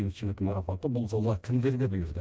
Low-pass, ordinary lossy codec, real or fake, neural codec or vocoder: none; none; fake; codec, 16 kHz, 1 kbps, FreqCodec, smaller model